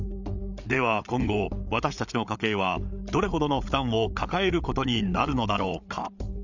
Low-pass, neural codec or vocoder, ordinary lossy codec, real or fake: 7.2 kHz; codec, 16 kHz, 8 kbps, FreqCodec, larger model; none; fake